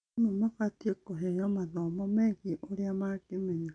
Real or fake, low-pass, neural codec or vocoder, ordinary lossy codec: real; 9.9 kHz; none; none